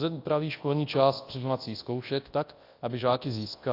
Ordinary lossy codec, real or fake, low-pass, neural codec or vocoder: AAC, 32 kbps; fake; 5.4 kHz; codec, 24 kHz, 0.9 kbps, WavTokenizer, large speech release